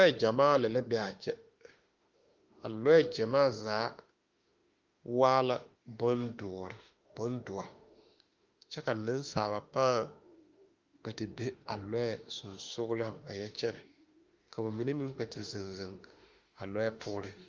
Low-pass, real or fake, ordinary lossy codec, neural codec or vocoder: 7.2 kHz; fake; Opus, 32 kbps; autoencoder, 48 kHz, 32 numbers a frame, DAC-VAE, trained on Japanese speech